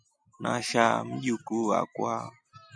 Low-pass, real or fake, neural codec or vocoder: 9.9 kHz; real; none